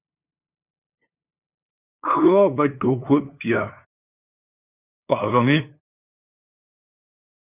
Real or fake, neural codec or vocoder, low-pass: fake; codec, 16 kHz, 2 kbps, FunCodec, trained on LibriTTS, 25 frames a second; 3.6 kHz